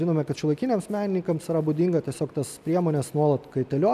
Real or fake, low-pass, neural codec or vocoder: real; 14.4 kHz; none